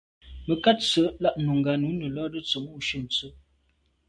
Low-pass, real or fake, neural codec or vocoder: 9.9 kHz; real; none